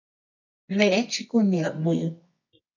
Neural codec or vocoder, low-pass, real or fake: codec, 24 kHz, 0.9 kbps, WavTokenizer, medium music audio release; 7.2 kHz; fake